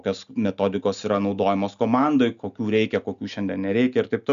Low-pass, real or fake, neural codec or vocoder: 7.2 kHz; real; none